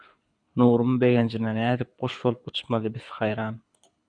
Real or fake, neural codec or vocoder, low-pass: fake; codec, 24 kHz, 6 kbps, HILCodec; 9.9 kHz